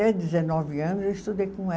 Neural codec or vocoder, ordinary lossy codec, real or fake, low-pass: none; none; real; none